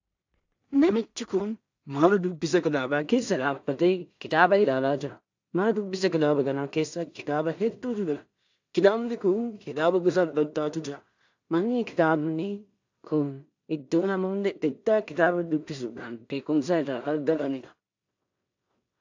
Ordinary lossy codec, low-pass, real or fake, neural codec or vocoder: AAC, 48 kbps; 7.2 kHz; fake; codec, 16 kHz in and 24 kHz out, 0.4 kbps, LongCat-Audio-Codec, two codebook decoder